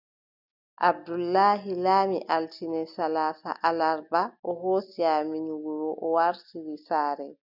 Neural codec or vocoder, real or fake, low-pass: none; real; 5.4 kHz